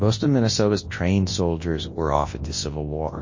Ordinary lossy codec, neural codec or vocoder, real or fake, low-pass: MP3, 32 kbps; codec, 24 kHz, 0.9 kbps, WavTokenizer, large speech release; fake; 7.2 kHz